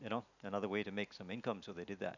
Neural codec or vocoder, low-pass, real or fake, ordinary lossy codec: none; 7.2 kHz; real; none